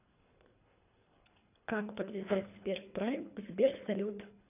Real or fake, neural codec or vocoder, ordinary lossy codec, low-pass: fake; codec, 24 kHz, 3 kbps, HILCodec; none; 3.6 kHz